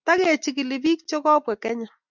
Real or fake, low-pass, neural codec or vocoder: real; 7.2 kHz; none